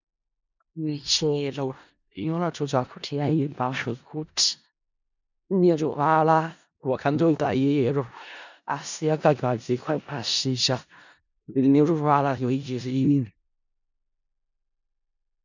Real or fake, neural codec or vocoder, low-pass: fake; codec, 16 kHz in and 24 kHz out, 0.4 kbps, LongCat-Audio-Codec, four codebook decoder; 7.2 kHz